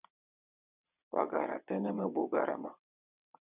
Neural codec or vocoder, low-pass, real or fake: vocoder, 22.05 kHz, 80 mel bands, WaveNeXt; 3.6 kHz; fake